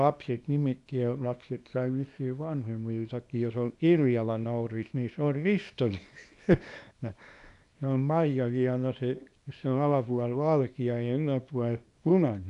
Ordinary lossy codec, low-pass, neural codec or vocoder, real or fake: none; 10.8 kHz; codec, 24 kHz, 0.9 kbps, WavTokenizer, small release; fake